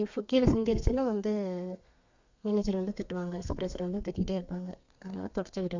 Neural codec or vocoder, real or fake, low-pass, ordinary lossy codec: codec, 32 kHz, 1.9 kbps, SNAC; fake; 7.2 kHz; MP3, 64 kbps